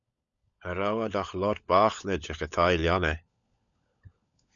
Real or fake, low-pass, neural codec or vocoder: fake; 7.2 kHz; codec, 16 kHz, 16 kbps, FunCodec, trained on LibriTTS, 50 frames a second